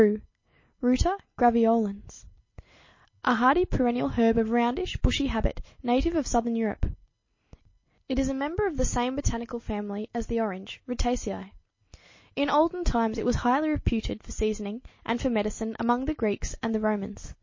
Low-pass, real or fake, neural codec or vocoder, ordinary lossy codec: 7.2 kHz; real; none; MP3, 32 kbps